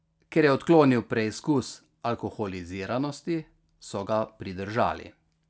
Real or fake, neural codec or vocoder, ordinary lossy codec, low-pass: real; none; none; none